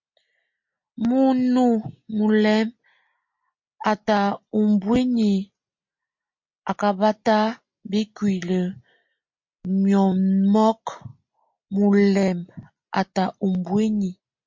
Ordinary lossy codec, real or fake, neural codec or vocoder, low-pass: MP3, 48 kbps; real; none; 7.2 kHz